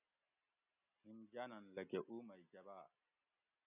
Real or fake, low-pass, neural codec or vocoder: real; 3.6 kHz; none